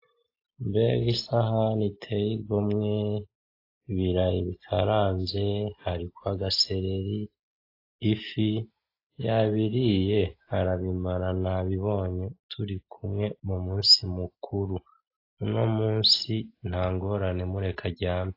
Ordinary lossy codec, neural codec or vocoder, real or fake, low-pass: AAC, 32 kbps; none; real; 5.4 kHz